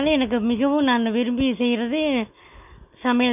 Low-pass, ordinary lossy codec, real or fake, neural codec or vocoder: 3.6 kHz; none; real; none